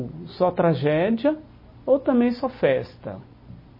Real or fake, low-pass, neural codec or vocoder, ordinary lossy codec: real; 5.4 kHz; none; MP3, 24 kbps